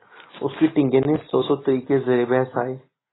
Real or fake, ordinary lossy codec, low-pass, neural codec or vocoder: real; AAC, 16 kbps; 7.2 kHz; none